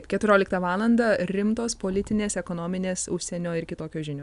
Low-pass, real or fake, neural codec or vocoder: 10.8 kHz; real; none